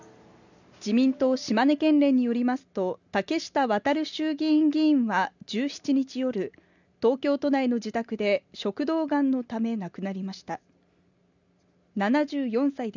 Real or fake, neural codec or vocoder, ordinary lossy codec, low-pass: real; none; none; 7.2 kHz